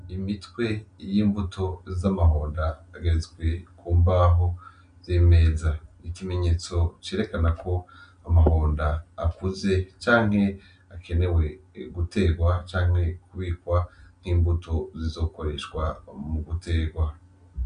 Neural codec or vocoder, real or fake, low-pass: none; real; 9.9 kHz